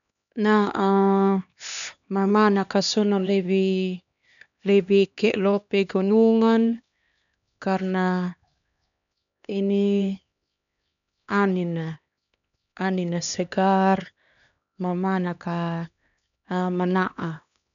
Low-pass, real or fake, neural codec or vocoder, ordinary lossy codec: 7.2 kHz; fake; codec, 16 kHz, 2 kbps, X-Codec, HuBERT features, trained on LibriSpeech; none